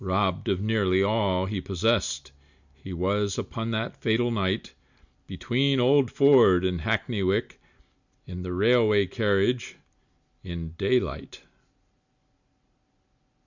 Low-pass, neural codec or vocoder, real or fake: 7.2 kHz; none; real